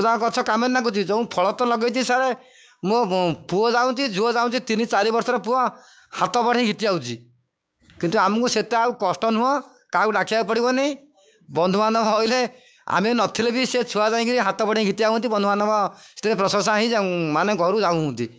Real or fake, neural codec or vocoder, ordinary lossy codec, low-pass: fake; codec, 16 kHz, 6 kbps, DAC; none; none